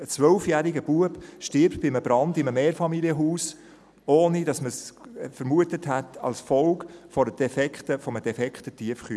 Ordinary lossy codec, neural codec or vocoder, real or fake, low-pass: none; none; real; none